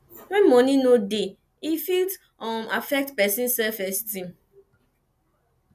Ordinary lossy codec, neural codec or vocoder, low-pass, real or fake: none; none; 14.4 kHz; real